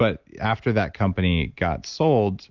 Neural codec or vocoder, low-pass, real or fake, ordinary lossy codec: none; 7.2 kHz; real; Opus, 24 kbps